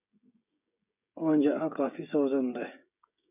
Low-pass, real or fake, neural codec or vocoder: 3.6 kHz; fake; codec, 16 kHz, 16 kbps, FreqCodec, smaller model